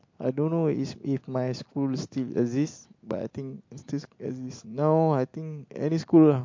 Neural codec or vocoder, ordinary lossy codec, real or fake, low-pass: none; MP3, 48 kbps; real; 7.2 kHz